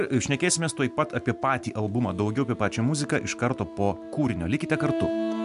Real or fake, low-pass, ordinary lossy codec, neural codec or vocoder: real; 10.8 kHz; MP3, 96 kbps; none